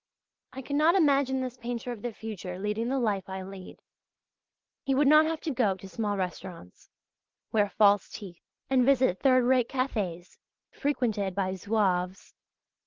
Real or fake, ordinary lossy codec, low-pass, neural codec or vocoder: real; Opus, 16 kbps; 7.2 kHz; none